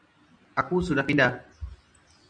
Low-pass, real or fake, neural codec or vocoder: 9.9 kHz; real; none